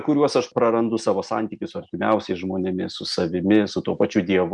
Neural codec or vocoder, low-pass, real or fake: none; 10.8 kHz; real